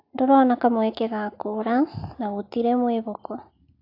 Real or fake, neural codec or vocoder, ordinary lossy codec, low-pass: real; none; AAC, 32 kbps; 5.4 kHz